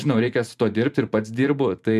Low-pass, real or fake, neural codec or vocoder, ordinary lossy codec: 14.4 kHz; fake; vocoder, 44.1 kHz, 128 mel bands every 512 samples, BigVGAN v2; MP3, 96 kbps